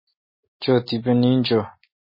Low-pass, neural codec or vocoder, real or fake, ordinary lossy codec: 5.4 kHz; none; real; MP3, 24 kbps